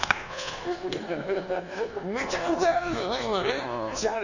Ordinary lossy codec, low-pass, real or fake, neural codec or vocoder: none; 7.2 kHz; fake; codec, 24 kHz, 1.2 kbps, DualCodec